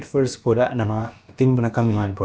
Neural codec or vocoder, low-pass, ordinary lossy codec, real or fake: codec, 16 kHz, about 1 kbps, DyCAST, with the encoder's durations; none; none; fake